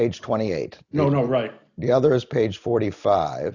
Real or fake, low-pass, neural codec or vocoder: real; 7.2 kHz; none